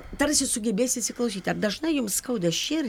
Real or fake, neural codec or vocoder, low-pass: real; none; 19.8 kHz